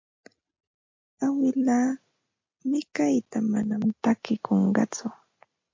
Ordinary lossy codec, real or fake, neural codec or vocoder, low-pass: MP3, 48 kbps; real; none; 7.2 kHz